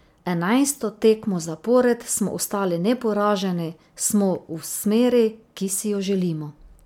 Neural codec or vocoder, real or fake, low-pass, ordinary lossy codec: none; real; 19.8 kHz; MP3, 96 kbps